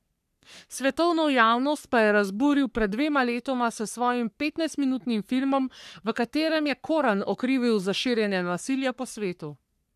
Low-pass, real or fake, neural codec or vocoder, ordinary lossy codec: 14.4 kHz; fake; codec, 44.1 kHz, 3.4 kbps, Pupu-Codec; none